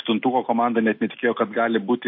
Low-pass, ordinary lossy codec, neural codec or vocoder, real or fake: 5.4 kHz; MP3, 32 kbps; none; real